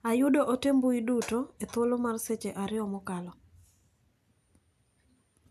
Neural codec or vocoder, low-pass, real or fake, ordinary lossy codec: none; 14.4 kHz; real; none